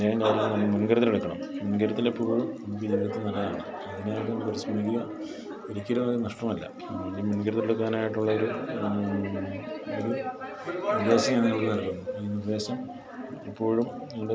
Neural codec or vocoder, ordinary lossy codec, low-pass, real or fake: none; none; none; real